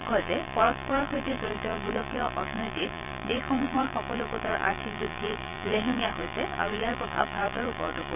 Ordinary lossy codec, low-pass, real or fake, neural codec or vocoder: none; 3.6 kHz; fake; vocoder, 22.05 kHz, 80 mel bands, Vocos